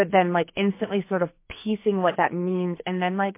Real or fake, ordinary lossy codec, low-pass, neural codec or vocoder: fake; MP3, 24 kbps; 3.6 kHz; codec, 44.1 kHz, 2.6 kbps, SNAC